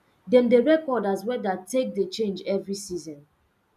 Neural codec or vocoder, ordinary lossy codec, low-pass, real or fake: none; none; 14.4 kHz; real